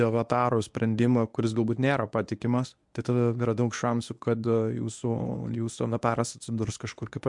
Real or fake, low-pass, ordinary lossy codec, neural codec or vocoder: fake; 10.8 kHz; MP3, 64 kbps; codec, 24 kHz, 0.9 kbps, WavTokenizer, medium speech release version 1